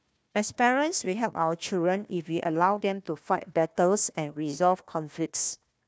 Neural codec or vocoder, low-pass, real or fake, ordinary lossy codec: codec, 16 kHz, 1 kbps, FunCodec, trained on Chinese and English, 50 frames a second; none; fake; none